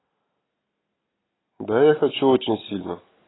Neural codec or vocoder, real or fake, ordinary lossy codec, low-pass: none; real; AAC, 16 kbps; 7.2 kHz